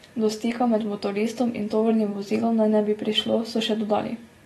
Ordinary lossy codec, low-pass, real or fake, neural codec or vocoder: AAC, 32 kbps; 19.8 kHz; real; none